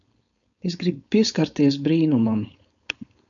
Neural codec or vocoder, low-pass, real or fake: codec, 16 kHz, 4.8 kbps, FACodec; 7.2 kHz; fake